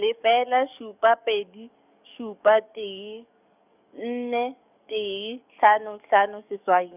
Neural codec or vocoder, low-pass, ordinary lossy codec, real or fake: codec, 44.1 kHz, 7.8 kbps, DAC; 3.6 kHz; none; fake